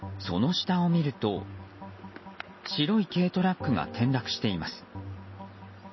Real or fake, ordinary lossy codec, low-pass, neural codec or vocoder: real; MP3, 24 kbps; 7.2 kHz; none